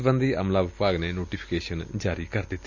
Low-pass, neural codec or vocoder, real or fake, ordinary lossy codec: 7.2 kHz; none; real; none